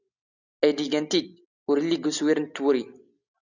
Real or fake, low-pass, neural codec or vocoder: real; 7.2 kHz; none